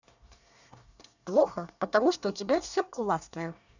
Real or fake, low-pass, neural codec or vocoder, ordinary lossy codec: fake; 7.2 kHz; codec, 24 kHz, 1 kbps, SNAC; none